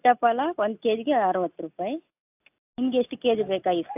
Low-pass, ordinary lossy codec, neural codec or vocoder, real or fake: 3.6 kHz; AAC, 32 kbps; none; real